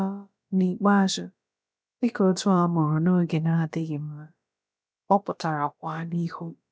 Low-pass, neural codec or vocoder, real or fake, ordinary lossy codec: none; codec, 16 kHz, about 1 kbps, DyCAST, with the encoder's durations; fake; none